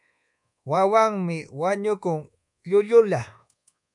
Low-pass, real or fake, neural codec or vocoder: 10.8 kHz; fake; codec, 24 kHz, 1.2 kbps, DualCodec